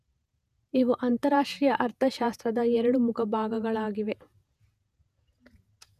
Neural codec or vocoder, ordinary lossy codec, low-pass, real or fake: vocoder, 48 kHz, 128 mel bands, Vocos; none; 14.4 kHz; fake